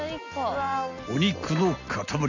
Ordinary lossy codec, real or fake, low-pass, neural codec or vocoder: none; real; 7.2 kHz; none